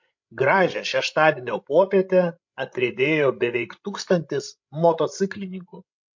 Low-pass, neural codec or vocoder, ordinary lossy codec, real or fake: 7.2 kHz; codec, 16 kHz, 16 kbps, FreqCodec, larger model; MP3, 48 kbps; fake